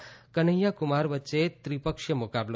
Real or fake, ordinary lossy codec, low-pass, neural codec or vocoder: real; none; none; none